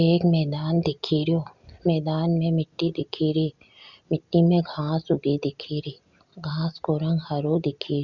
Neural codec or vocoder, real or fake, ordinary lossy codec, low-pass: none; real; Opus, 64 kbps; 7.2 kHz